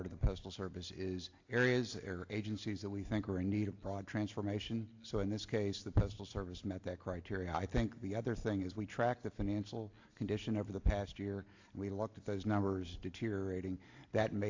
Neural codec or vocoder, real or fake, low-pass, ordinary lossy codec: none; real; 7.2 kHz; AAC, 48 kbps